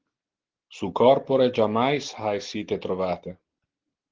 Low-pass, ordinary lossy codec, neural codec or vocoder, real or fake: 7.2 kHz; Opus, 16 kbps; none; real